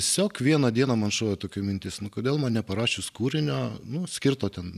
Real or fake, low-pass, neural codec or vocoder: real; 14.4 kHz; none